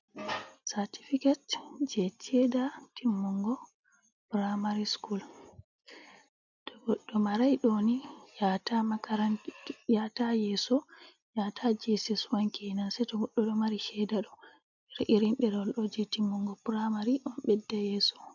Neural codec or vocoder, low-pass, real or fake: none; 7.2 kHz; real